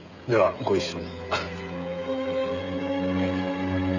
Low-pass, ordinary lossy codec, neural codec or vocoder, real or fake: 7.2 kHz; none; codec, 16 kHz, 16 kbps, FreqCodec, smaller model; fake